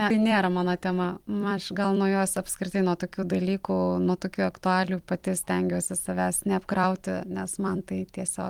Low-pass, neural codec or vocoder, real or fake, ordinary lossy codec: 19.8 kHz; vocoder, 44.1 kHz, 128 mel bands every 256 samples, BigVGAN v2; fake; Opus, 24 kbps